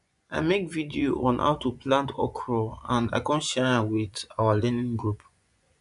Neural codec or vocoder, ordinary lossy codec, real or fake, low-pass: vocoder, 24 kHz, 100 mel bands, Vocos; AAC, 96 kbps; fake; 10.8 kHz